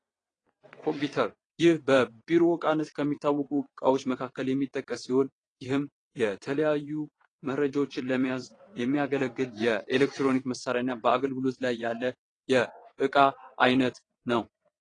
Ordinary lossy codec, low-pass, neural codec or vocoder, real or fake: AAC, 32 kbps; 9.9 kHz; vocoder, 22.05 kHz, 80 mel bands, WaveNeXt; fake